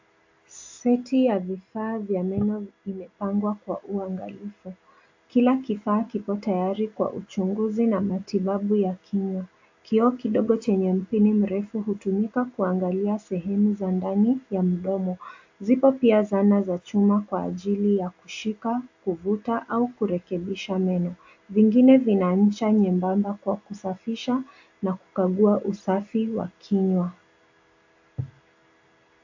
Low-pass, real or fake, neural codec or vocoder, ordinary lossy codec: 7.2 kHz; real; none; AAC, 48 kbps